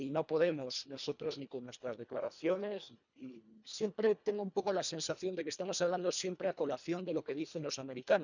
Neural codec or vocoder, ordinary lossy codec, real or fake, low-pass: codec, 24 kHz, 1.5 kbps, HILCodec; none; fake; 7.2 kHz